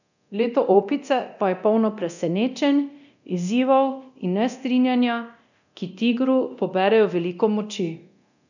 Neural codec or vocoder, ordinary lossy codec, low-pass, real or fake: codec, 24 kHz, 0.9 kbps, DualCodec; none; 7.2 kHz; fake